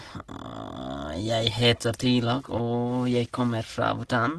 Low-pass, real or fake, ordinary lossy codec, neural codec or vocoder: 10.8 kHz; real; Opus, 16 kbps; none